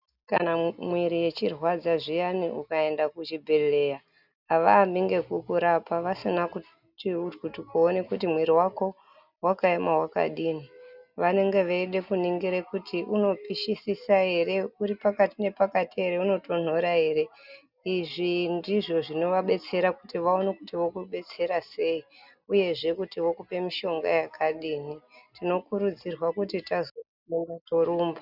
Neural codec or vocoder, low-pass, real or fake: none; 5.4 kHz; real